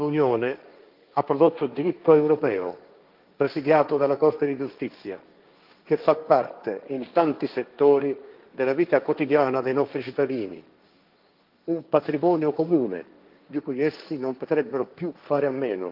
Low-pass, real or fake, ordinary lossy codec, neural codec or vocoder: 5.4 kHz; fake; Opus, 32 kbps; codec, 16 kHz, 1.1 kbps, Voila-Tokenizer